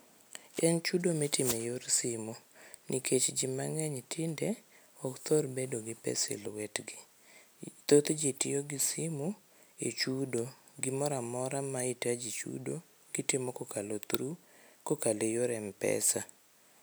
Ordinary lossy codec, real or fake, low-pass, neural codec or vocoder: none; real; none; none